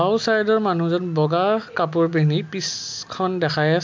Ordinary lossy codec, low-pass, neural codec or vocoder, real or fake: MP3, 64 kbps; 7.2 kHz; none; real